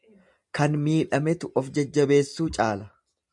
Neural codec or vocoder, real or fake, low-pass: none; real; 10.8 kHz